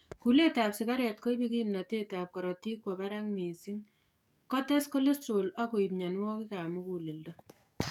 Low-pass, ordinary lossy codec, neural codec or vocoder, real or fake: 19.8 kHz; none; codec, 44.1 kHz, 7.8 kbps, DAC; fake